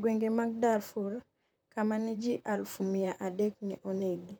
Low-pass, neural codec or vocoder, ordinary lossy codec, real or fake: none; vocoder, 44.1 kHz, 128 mel bands, Pupu-Vocoder; none; fake